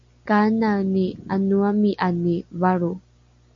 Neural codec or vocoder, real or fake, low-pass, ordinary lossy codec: none; real; 7.2 kHz; MP3, 48 kbps